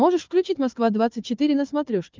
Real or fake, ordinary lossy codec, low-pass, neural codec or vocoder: fake; Opus, 24 kbps; 7.2 kHz; codec, 16 kHz, 4 kbps, FunCodec, trained on Chinese and English, 50 frames a second